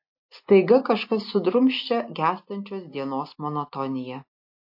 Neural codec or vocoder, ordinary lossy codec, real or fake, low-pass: none; MP3, 32 kbps; real; 5.4 kHz